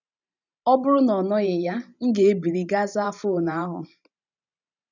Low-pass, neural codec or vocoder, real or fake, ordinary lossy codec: 7.2 kHz; vocoder, 44.1 kHz, 128 mel bands every 512 samples, BigVGAN v2; fake; none